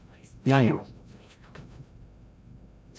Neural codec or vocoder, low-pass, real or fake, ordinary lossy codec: codec, 16 kHz, 0.5 kbps, FreqCodec, larger model; none; fake; none